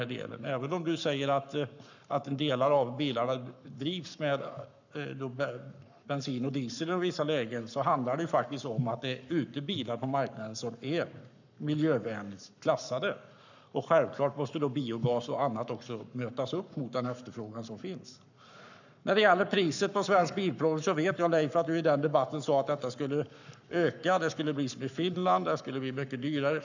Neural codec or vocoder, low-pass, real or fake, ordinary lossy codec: codec, 44.1 kHz, 7.8 kbps, Pupu-Codec; 7.2 kHz; fake; none